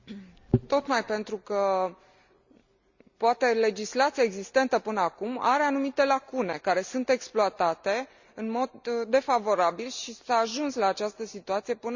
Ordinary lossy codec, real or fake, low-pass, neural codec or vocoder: Opus, 64 kbps; real; 7.2 kHz; none